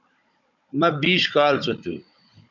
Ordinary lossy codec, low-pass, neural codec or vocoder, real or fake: MP3, 64 kbps; 7.2 kHz; codec, 16 kHz, 16 kbps, FunCodec, trained on Chinese and English, 50 frames a second; fake